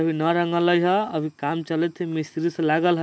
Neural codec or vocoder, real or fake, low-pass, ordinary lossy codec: none; real; none; none